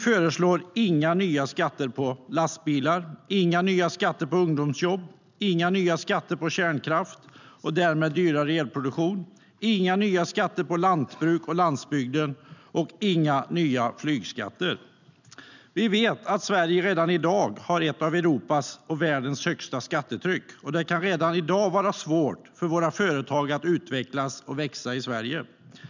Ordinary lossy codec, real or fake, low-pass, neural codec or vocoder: none; real; 7.2 kHz; none